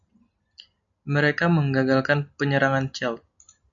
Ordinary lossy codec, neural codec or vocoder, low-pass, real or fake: MP3, 64 kbps; none; 7.2 kHz; real